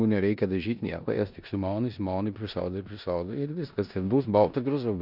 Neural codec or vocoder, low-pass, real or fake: codec, 16 kHz in and 24 kHz out, 0.9 kbps, LongCat-Audio-Codec, four codebook decoder; 5.4 kHz; fake